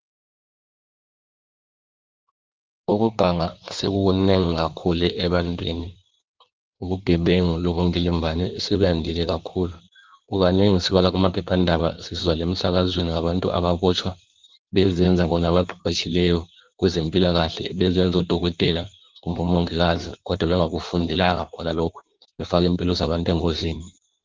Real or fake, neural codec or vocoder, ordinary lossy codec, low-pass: fake; codec, 16 kHz in and 24 kHz out, 1.1 kbps, FireRedTTS-2 codec; Opus, 32 kbps; 7.2 kHz